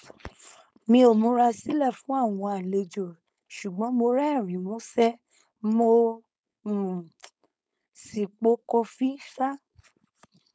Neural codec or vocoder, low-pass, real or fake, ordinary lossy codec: codec, 16 kHz, 4.8 kbps, FACodec; none; fake; none